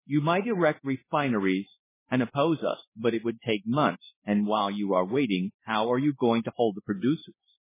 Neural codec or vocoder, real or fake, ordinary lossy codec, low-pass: codec, 16 kHz in and 24 kHz out, 1 kbps, XY-Tokenizer; fake; MP3, 16 kbps; 3.6 kHz